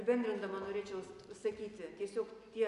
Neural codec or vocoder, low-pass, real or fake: none; 10.8 kHz; real